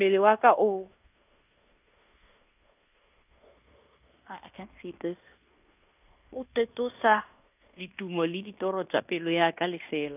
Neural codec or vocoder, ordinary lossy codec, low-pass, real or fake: codec, 16 kHz in and 24 kHz out, 0.9 kbps, LongCat-Audio-Codec, fine tuned four codebook decoder; none; 3.6 kHz; fake